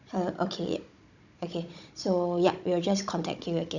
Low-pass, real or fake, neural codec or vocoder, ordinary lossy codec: 7.2 kHz; fake; codec, 16 kHz, 16 kbps, FunCodec, trained on Chinese and English, 50 frames a second; none